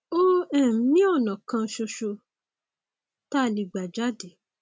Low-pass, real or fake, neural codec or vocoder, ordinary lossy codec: none; real; none; none